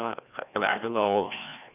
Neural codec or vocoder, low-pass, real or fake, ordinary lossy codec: codec, 16 kHz, 1 kbps, FreqCodec, larger model; 3.6 kHz; fake; none